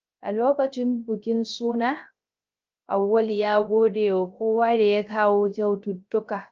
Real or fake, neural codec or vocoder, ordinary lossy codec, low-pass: fake; codec, 16 kHz, 0.3 kbps, FocalCodec; Opus, 24 kbps; 7.2 kHz